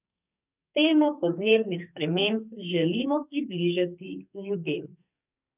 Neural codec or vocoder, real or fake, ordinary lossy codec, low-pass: codec, 44.1 kHz, 2.6 kbps, SNAC; fake; none; 3.6 kHz